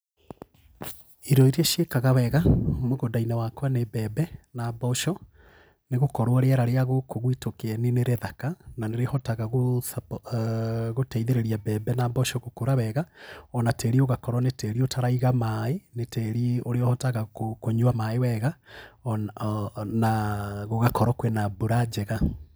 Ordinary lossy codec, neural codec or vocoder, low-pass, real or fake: none; none; none; real